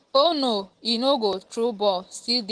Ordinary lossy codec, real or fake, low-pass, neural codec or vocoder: Opus, 16 kbps; real; 9.9 kHz; none